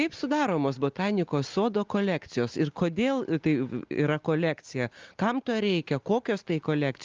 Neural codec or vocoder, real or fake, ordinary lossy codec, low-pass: none; real; Opus, 24 kbps; 7.2 kHz